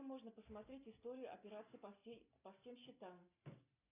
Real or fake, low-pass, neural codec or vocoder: real; 3.6 kHz; none